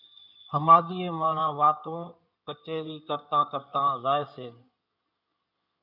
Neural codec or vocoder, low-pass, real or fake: codec, 16 kHz in and 24 kHz out, 2.2 kbps, FireRedTTS-2 codec; 5.4 kHz; fake